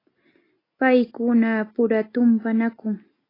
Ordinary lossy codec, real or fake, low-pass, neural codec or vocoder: AAC, 24 kbps; real; 5.4 kHz; none